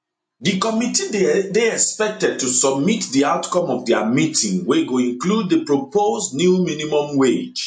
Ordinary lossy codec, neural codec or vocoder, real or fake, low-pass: MP3, 48 kbps; none; real; 9.9 kHz